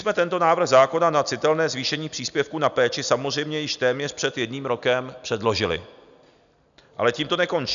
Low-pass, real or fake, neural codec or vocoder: 7.2 kHz; real; none